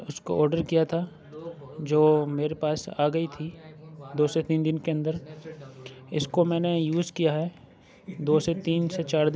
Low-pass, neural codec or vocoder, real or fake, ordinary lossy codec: none; none; real; none